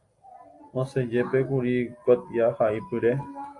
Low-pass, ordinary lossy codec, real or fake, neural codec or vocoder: 10.8 kHz; AAC, 48 kbps; real; none